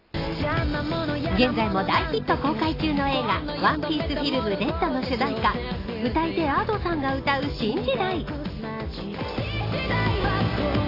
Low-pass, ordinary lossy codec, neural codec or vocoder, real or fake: 5.4 kHz; AAC, 24 kbps; none; real